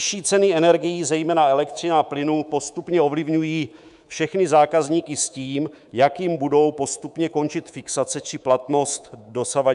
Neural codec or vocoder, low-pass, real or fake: codec, 24 kHz, 3.1 kbps, DualCodec; 10.8 kHz; fake